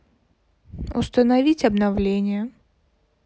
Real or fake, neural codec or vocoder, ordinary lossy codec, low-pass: real; none; none; none